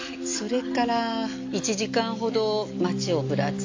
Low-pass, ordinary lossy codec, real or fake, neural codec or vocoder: 7.2 kHz; MP3, 64 kbps; real; none